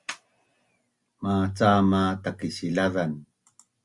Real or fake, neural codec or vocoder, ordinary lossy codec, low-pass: real; none; Opus, 64 kbps; 10.8 kHz